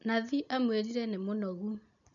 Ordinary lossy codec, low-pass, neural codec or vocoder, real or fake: none; 7.2 kHz; none; real